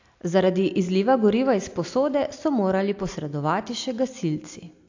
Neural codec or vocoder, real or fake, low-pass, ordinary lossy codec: none; real; 7.2 kHz; AAC, 48 kbps